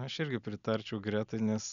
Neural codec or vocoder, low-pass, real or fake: none; 7.2 kHz; real